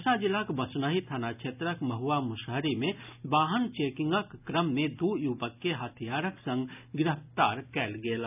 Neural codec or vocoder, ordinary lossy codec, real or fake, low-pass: none; none; real; 3.6 kHz